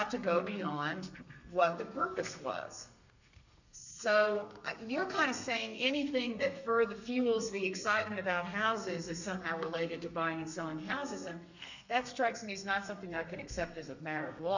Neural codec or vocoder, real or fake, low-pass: codec, 32 kHz, 1.9 kbps, SNAC; fake; 7.2 kHz